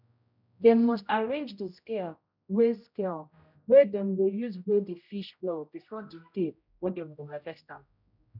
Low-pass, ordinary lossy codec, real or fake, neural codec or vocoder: 5.4 kHz; none; fake; codec, 16 kHz, 0.5 kbps, X-Codec, HuBERT features, trained on general audio